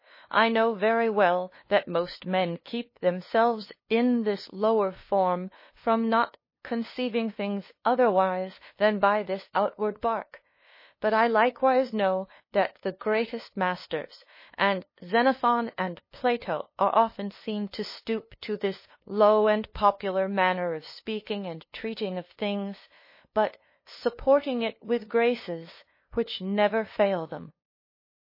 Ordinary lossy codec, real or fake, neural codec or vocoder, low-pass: MP3, 24 kbps; fake; codec, 16 kHz, 2 kbps, FunCodec, trained on LibriTTS, 25 frames a second; 5.4 kHz